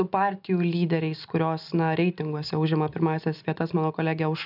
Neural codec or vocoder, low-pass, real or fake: none; 5.4 kHz; real